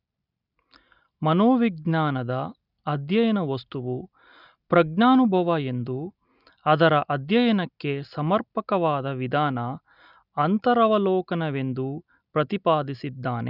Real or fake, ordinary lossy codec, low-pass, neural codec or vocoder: real; none; 5.4 kHz; none